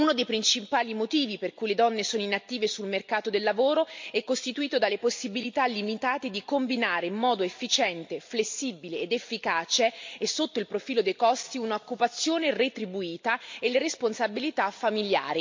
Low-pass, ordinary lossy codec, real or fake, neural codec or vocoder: 7.2 kHz; MP3, 48 kbps; real; none